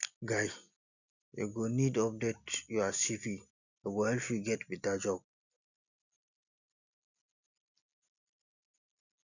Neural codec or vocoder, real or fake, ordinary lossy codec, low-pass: none; real; none; 7.2 kHz